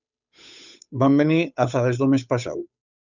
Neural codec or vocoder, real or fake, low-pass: codec, 16 kHz, 8 kbps, FunCodec, trained on Chinese and English, 25 frames a second; fake; 7.2 kHz